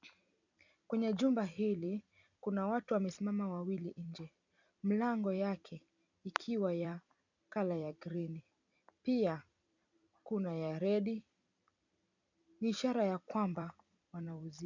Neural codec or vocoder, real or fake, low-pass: vocoder, 44.1 kHz, 128 mel bands every 256 samples, BigVGAN v2; fake; 7.2 kHz